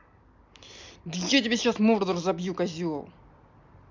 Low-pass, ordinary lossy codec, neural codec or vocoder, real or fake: 7.2 kHz; MP3, 64 kbps; none; real